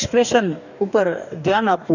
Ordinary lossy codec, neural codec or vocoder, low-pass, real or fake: none; codec, 44.1 kHz, 2.6 kbps, DAC; 7.2 kHz; fake